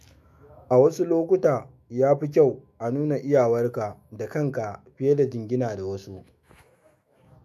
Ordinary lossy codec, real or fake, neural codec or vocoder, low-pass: MP3, 64 kbps; fake; autoencoder, 48 kHz, 128 numbers a frame, DAC-VAE, trained on Japanese speech; 14.4 kHz